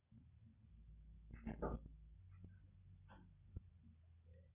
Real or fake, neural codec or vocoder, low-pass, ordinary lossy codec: real; none; 3.6 kHz; Opus, 64 kbps